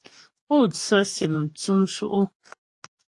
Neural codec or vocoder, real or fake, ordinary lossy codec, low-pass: codec, 44.1 kHz, 2.6 kbps, DAC; fake; AAC, 64 kbps; 10.8 kHz